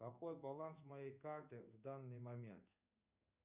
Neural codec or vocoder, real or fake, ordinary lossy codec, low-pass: codec, 16 kHz in and 24 kHz out, 1 kbps, XY-Tokenizer; fake; Opus, 64 kbps; 3.6 kHz